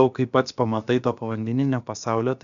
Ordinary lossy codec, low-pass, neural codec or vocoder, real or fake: MP3, 96 kbps; 7.2 kHz; codec, 16 kHz, about 1 kbps, DyCAST, with the encoder's durations; fake